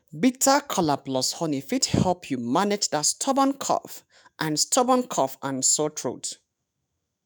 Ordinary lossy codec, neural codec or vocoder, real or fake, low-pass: none; autoencoder, 48 kHz, 128 numbers a frame, DAC-VAE, trained on Japanese speech; fake; none